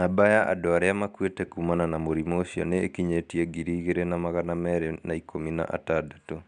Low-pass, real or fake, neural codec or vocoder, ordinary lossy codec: 9.9 kHz; real; none; MP3, 96 kbps